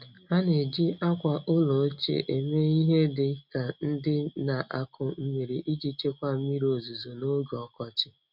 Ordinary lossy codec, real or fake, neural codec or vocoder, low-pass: none; real; none; 5.4 kHz